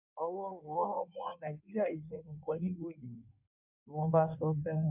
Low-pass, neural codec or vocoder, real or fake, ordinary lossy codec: 3.6 kHz; codec, 16 kHz in and 24 kHz out, 1.1 kbps, FireRedTTS-2 codec; fake; MP3, 32 kbps